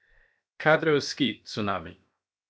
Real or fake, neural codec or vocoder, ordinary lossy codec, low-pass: fake; codec, 16 kHz, 0.7 kbps, FocalCodec; none; none